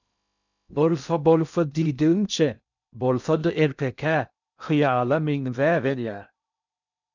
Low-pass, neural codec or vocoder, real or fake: 7.2 kHz; codec, 16 kHz in and 24 kHz out, 0.6 kbps, FocalCodec, streaming, 2048 codes; fake